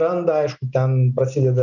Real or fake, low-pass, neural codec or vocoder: real; 7.2 kHz; none